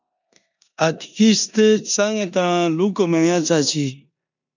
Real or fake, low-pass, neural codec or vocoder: fake; 7.2 kHz; codec, 16 kHz in and 24 kHz out, 0.9 kbps, LongCat-Audio-Codec, four codebook decoder